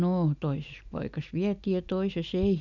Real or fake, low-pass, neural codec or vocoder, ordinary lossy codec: real; 7.2 kHz; none; none